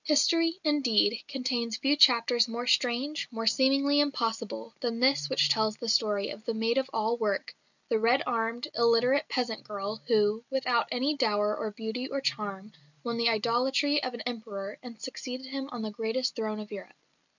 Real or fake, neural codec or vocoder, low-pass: real; none; 7.2 kHz